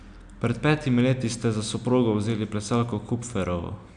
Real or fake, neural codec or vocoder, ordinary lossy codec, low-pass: real; none; none; 9.9 kHz